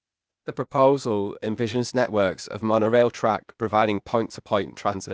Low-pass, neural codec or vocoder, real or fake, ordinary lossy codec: none; codec, 16 kHz, 0.8 kbps, ZipCodec; fake; none